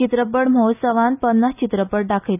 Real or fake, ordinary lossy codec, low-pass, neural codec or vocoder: real; none; 3.6 kHz; none